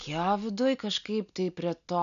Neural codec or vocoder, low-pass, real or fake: none; 7.2 kHz; real